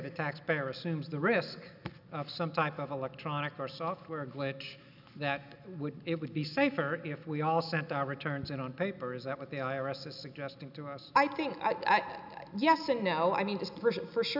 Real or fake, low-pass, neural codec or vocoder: real; 5.4 kHz; none